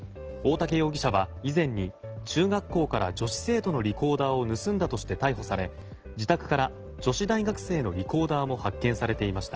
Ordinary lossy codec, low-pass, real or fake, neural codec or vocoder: Opus, 16 kbps; 7.2 kHz; real; none